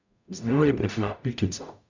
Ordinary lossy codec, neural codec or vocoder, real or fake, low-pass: none; codec, 44.1 kHz, 0.9 kbps, DAC; fake; 7.2 kHz